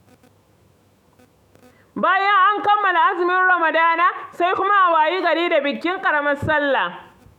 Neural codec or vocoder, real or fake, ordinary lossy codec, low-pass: autoencoder, 48 kHz, 128 numbers a frame, DAC-VAE, trained on Japanese speech; fake; none; 19.8 kHz